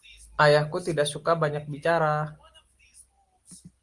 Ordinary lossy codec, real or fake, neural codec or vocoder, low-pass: Opus, 24 kbps; real; none; 10.8 kHz